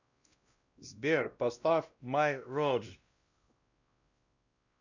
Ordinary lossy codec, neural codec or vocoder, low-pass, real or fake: Opus, 64 kbps; codec, 16 kHz, 1 kbps, X-Codec, WavLM features, trained on Multilingual LibriSpeech; 7.2 kHz; fake